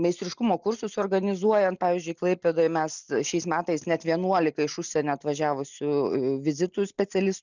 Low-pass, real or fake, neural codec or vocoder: 7.2 kHz; real; none